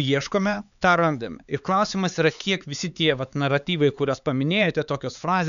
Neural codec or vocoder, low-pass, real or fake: codec, 16 kHz, 4 kbps, X-Codec, HuBERT features, trained on LibriSpeech; 7.2 kHz; fake